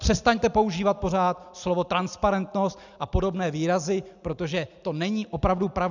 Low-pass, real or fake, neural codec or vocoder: 7.2 kHz; real; none